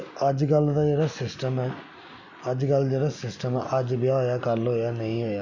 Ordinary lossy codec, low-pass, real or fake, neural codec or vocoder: none; 7.2 kHz; real; none